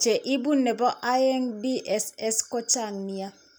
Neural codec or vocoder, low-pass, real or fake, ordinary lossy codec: none; none; real; none